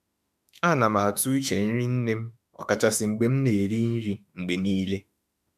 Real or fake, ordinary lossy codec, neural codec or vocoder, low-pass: fake; none; autoencoder, 48 kHz, 32 numbers a frame, DAC-VAE, trained on Japanese speech; 14.4 kHz